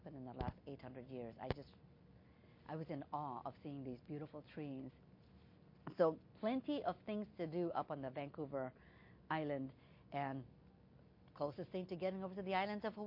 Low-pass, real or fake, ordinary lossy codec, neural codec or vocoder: 5.4 kHz; real; MP3, 32 kbps; none